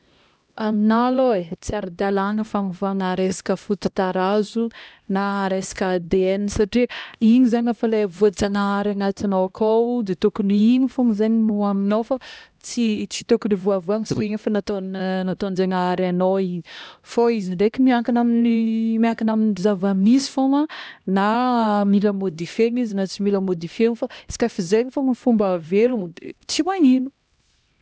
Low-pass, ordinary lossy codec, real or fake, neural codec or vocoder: none; none; fake; codec, 16 kHz, 1 kbps, X-Codec, HuBERT features, trained on LibriSpeech